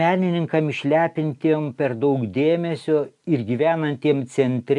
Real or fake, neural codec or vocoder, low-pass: real; none; 10.8 kHz